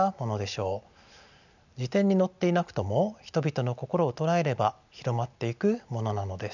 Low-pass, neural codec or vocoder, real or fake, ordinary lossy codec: 7.2 kHz; none; real; none